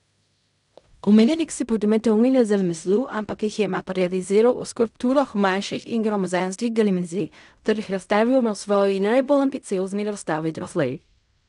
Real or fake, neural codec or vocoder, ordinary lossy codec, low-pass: fake; codec, 16 kHz in and 24 kHz out, 0.4 kbps, LongCat-Audio-Codec, fine tuned four codebook decoder; none; 10.8 kHz